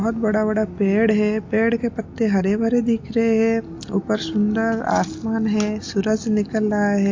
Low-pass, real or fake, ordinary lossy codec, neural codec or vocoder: 7.2 kHz; real; AAC, 48 kbps; none